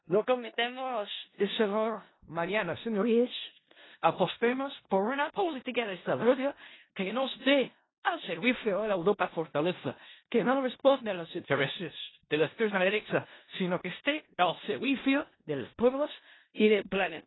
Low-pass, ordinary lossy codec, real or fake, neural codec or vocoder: 7.2 kHz; AAC, 16 kbps; fake; codec, 16 kHz in and 24 kHz out, 0.4 kbps, LongCat-Audio-Codec, four codebook decoder